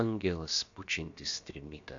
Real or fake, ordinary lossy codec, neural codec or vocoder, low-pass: fake; AAC, 48 kbps; codec, 16 kHz, about 1 kbps, DyCAST, with the encoder's durations; 7.2 kHz